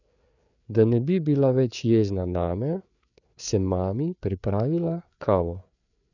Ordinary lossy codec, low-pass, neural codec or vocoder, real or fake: none; 7.2 kHz; codec, 16 kHz, 4 kbps, FreqCodec, larger model; fake